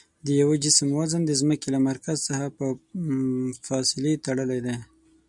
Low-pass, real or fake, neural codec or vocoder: 10.8 kHz; real; none